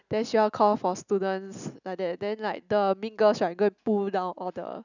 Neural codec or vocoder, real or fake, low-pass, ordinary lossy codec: none; real; 7.2 kHz; none